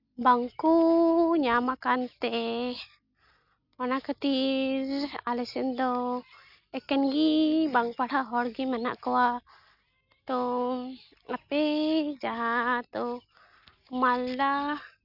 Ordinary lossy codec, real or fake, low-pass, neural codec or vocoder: none; real; 5.4 kHz; none